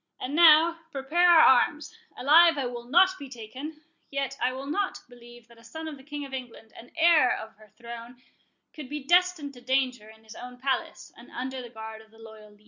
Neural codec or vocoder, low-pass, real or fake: none; 7.2 kHz; real